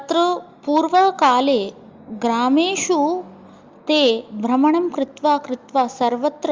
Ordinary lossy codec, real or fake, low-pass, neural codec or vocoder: Opus, 32 kbps; real; 7.2 kHz; none